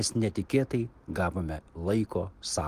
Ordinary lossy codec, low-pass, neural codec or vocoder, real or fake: Opus, 24 kbps; 14.4 kHz; none; real